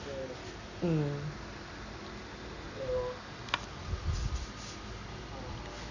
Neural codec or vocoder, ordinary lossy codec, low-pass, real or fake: none; none; 7.2 kHz; real